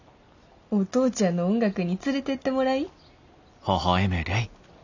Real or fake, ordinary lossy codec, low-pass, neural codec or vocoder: real; none; 7.2 kHz; none